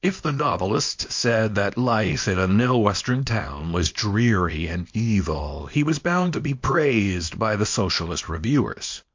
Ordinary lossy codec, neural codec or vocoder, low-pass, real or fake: MP3, 48 kbps; codec, 24 kHz, 0.9 kbps, WavTokenizer, small release; 7.2 kHz; fake